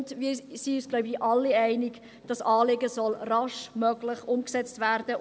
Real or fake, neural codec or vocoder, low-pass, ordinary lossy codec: real; none; none; none